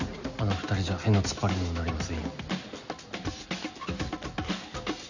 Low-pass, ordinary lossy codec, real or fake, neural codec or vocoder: 7.2 kHz; none; real; none